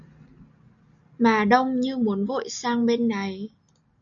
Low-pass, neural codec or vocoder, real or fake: 7.2 kHz; none; real